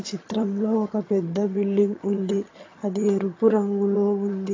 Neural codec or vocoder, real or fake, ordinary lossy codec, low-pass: vocoder, 22.05 kHz, 80 mel bands, HiFi-GAN; fake; AAC, 32 kbps; 7.2 kHz